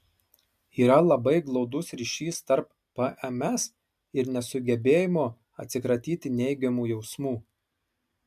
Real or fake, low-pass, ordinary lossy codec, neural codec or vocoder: real; 14.4 kHz; MP3, 96 kbps; none